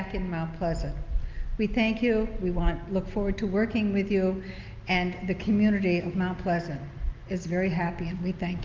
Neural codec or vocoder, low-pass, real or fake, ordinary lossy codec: none; 7.2 kHz; real; Opus, 24 kbps